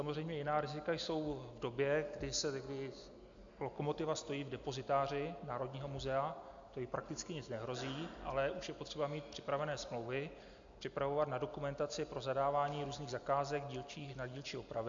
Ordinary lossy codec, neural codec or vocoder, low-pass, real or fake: AAC, 64 kbps; none; 7.2 kHz; real